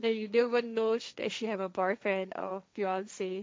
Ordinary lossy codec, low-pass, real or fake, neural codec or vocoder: none; none; fake; codec, 16 kHz, 1.1 kbps, Voila-Tokenizer